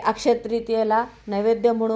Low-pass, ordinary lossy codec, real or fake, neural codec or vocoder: none; none; real; none